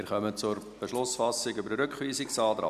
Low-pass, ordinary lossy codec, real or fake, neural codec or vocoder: 14.4 kHz; none; real; none